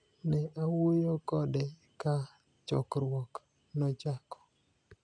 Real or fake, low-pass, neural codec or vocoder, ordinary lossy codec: real; 9.9 kHz; none; none